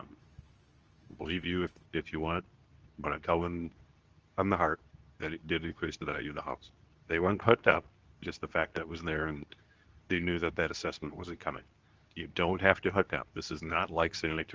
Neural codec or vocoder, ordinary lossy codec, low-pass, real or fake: codec, 24 kHz, 0.9 kbps, WavTokenizer, medium speech release version 2; Opus, 24 kbps; 7.2 kHz; fake